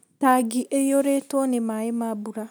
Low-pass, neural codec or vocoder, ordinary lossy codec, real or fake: none; none; none; real